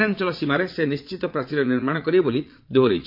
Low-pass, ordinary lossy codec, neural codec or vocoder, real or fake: 5.4 kHz; MP3, 32 kbps; vocoder, 22.05 kHz, 80 mel bands, Vocos; fake